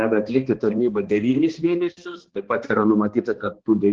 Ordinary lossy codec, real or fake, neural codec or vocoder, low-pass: Opus, 16 kbps; fake; codec, 16 kHz, 2 kbps, X-Codec, HuBERT features, trained on balanced general audio; 7.2 kHz